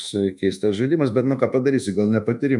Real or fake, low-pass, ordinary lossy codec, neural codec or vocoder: fake; 10.8 kHz; MP3, 96 kbps; codec, 24 kHz, 1.2 kbps, DualCodec